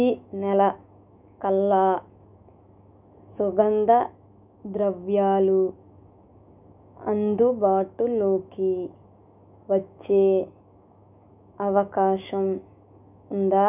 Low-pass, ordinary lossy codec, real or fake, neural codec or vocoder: 3.6 kHz; none; fake; autoencoder, 48 kHz, 128 numbers a frame, DAC-VAE, trained on Japanese speech